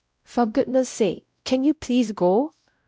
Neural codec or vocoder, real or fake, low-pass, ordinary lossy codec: codec, 16 kHz, 0.5 kbps, X-Codec, WavLM features, trained on Multilingual LibriSpeech; fake; none; none